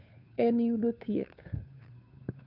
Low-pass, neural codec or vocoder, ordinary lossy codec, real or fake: 5.4 kHz; codec, 16 kHz, 2 kbps, FunCodec, trained on Chinese and English, 25 frames a second; none; fake